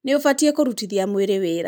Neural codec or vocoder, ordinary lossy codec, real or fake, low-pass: none; none; real; none